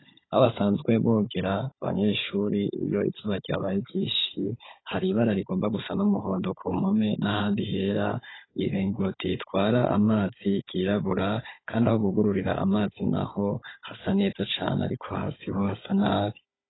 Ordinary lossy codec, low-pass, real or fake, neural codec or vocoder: AAC, 16 kbps; 7.2 kHz; fake; codec, 16 kHz, 16 kbps, FunCodec, trained on Chinese and English, 50 frames a second